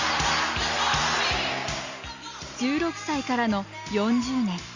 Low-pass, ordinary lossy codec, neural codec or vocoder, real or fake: 7.2 kHz; Opus, 64 kbps; none; real